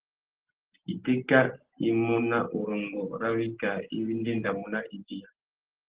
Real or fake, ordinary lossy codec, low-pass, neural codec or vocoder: real; Opus, 16 kbps; 3.6 kHz; none